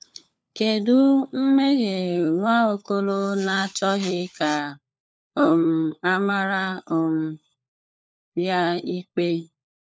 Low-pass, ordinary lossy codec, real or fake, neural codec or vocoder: none; none; fake; codec, 16 kHz, 4 kbps, FunCodec, trained on LibriTTS, 50 frames a second